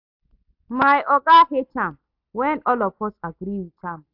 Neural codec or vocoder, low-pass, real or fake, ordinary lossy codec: none; 5.4 kHz; real; AAC, 48 kbps